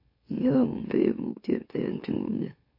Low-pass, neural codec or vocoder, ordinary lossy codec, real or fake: 5.4 kHz; autoencoder, 44.1 kHz, a latent of 192 numbers a frame, MeloTTS; MP3, 32 kbps; fake